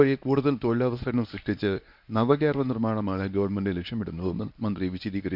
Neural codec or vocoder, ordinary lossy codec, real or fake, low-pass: codec, 24 kHz, 0.9 kbps, WavTokenizer, medium speech release version 1; none; fake; 5.4 kHz